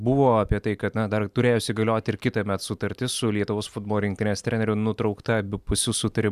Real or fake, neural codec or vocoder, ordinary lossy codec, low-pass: real; none; Opus, 64 kbps; 14.4 kHz